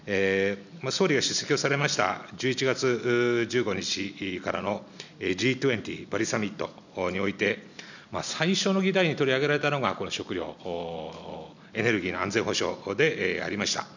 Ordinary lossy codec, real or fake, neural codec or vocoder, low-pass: none; real; none; 7.2 kHz